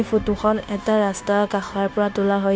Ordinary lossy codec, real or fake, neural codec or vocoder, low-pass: none; fake; codec, 16 kHz, 0.9 kbps, LongCat-Audio-Codec; none